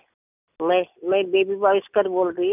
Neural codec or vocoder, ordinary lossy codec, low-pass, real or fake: none; none; 3.6 kHz; real